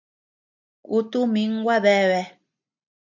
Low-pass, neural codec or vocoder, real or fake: 7.2 kHz; none; real